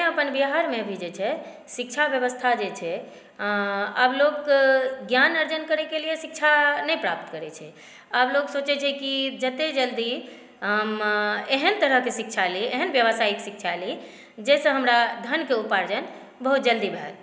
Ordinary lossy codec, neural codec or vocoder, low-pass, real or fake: none; none; none; real